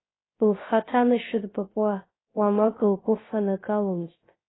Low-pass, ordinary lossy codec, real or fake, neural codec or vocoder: 7.2 kHz; AAC, 16 kbps; fake; codec, 16 kHz, 0.3 kbps, FocalCodec